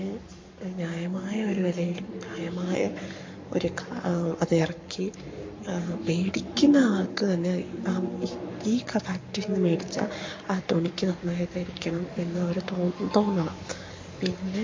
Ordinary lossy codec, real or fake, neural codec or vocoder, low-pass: MP3, 48 kbps; fake; codec, 44.1 kHz, 7.8 kbps, Pupu-Codec; 7.2 kHz